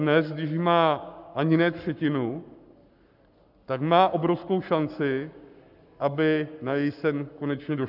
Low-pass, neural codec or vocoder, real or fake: 5.4 kHz; codec, 44.1 kHz, 7.8 kbps, Pupu-Codec; fake